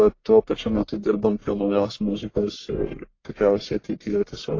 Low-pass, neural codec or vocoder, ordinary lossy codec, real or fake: 7.2 kHz; codec, 44.1 kHz, 1.7 kbps, Pupu-Codec; AAC, 32 kbps; fake